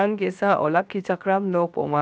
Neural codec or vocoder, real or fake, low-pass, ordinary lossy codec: codec, 16 kHz, 0.7 kbps, FocalCodec; fake; none; none